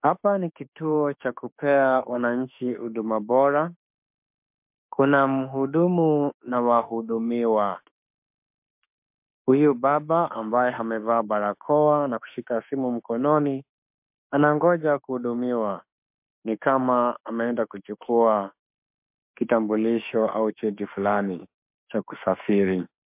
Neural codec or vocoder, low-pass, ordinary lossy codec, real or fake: autoencoder, 48 kHz, 32 numbers a frame, DAC-VAE, trained on Japanese speech; 3.6 kHz; MP3, 32 kbps; fake